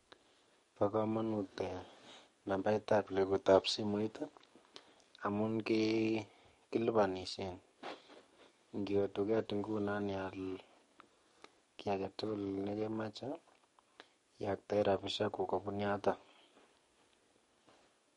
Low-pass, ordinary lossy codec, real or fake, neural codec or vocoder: 19.8 kHz; MP3, 48 kbps; fake; codec, 44.1 kHz, 7.8 kbps, DAC